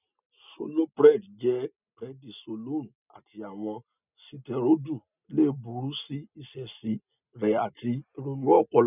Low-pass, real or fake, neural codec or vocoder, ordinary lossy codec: 3.6 kHz; real; none; none